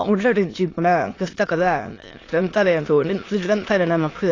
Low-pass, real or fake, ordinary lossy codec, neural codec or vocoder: 7.2 kHz; fake; AAC, 32 kbps; autoencoder, 22.05 kHz, a latent of 192 numbers a frame, VITS, trained on many speakers